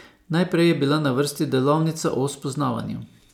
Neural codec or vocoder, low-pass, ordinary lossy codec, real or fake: none; 19.8 kHz; none; real